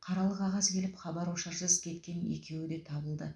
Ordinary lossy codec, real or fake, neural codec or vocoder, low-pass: none; real; none; none